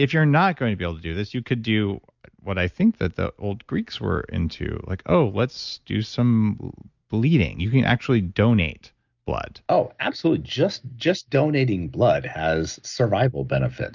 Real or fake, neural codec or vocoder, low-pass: real; none; 7.2 kHz